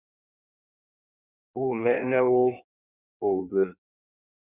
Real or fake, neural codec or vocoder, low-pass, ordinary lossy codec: fake; codec, 16 kHz in and 24 kHz out, 1.1 kbps, FireRedTTS-2 codec; 3.6 kHz; Opus, 64 kbps